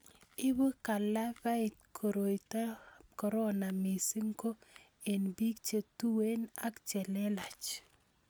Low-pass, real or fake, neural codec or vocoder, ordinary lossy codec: none; real; none; none